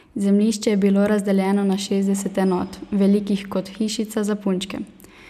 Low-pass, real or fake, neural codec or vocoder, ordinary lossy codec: 14.4 kHz; real; none; none